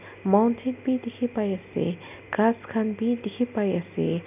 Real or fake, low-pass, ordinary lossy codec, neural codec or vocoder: real; 3.6 kHz; none; none